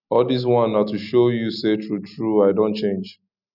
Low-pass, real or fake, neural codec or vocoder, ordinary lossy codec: 5.4 kHz; real; none; none